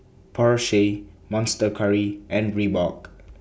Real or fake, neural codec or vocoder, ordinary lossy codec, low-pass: real; none; none; none